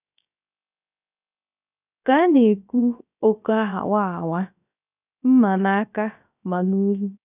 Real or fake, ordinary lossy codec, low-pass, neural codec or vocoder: fake; none; 3.6 kHz; codec, 16 kHz, 0.3 kbps, FocalCodec